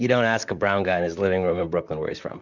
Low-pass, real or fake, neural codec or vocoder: 7.2 kHz; fake; vocoder, 44.1 kHz, 128 mel bands, Pupu-Vocoder